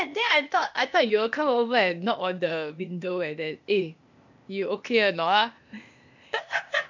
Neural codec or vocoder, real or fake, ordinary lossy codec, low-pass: codec, 16 kHz, 0.8 kbps, ZipCodec; fake; MP3, 64 kbps; 7.2 kHz